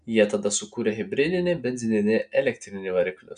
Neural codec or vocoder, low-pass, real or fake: none; 9.9 kHz; real